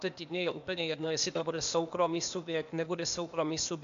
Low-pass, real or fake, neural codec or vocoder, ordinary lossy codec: 7.2 kHz; fake; codec, 16 kHz, 0.8 kbps, ZipCodec; MP3, 64 kbps